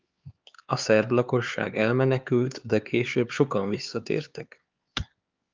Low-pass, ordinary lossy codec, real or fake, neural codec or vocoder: 7.2 kHz; Opus, 32 kbps; fake; codec, 16 kHz, 4 kbps, X-Codec, HuBERT features, trained on LibriSpeech